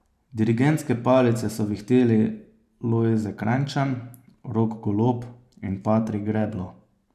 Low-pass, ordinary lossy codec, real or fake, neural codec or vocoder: 14.4 kHz; none; fake; vocoder, 44.1 kHz, 128 mel bands every 512 samples, BigVGAN v2